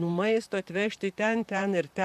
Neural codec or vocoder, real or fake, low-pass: vocoder, 44.1 kHz, 128 mel bands, Pupu-Vocoder; fake; 14.4 kHz